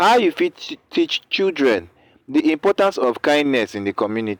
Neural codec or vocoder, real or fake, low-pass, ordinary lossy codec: none; real; 19.8 kHz; none